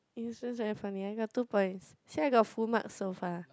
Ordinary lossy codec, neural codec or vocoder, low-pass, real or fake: none; none; none; real